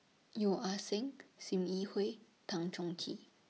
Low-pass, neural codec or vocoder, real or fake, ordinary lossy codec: none; none; real; none